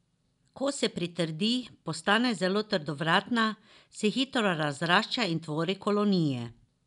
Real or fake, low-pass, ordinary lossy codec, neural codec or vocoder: real; 10.8 kHz; none; none